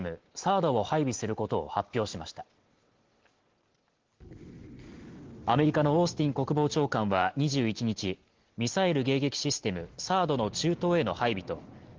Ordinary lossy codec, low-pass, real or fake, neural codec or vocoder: Opus, 16 kbps; 7.2 kHz; real; none